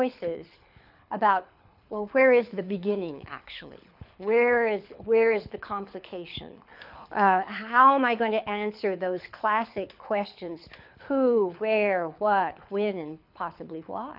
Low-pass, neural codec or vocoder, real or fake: 5.4 kHz; codec, 24 kHz, 6 kbps, HILCodec; fake